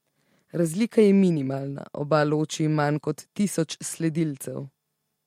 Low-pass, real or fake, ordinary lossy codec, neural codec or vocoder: 19.8 kHz; real; MP3, 64 kbps; none